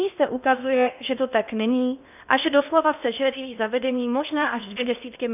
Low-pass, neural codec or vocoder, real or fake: 3.6 kHz; codec, 16 kHz in and 24 kHz out, 0.8 kbps, FocalCodec, streaming, 65536 codes; fake